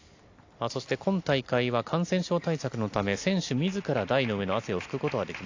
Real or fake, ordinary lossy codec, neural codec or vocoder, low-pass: real; AAC, 48 kbps; none; 7.2 kHz